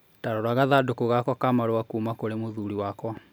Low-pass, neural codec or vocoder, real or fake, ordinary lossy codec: none; none; real; none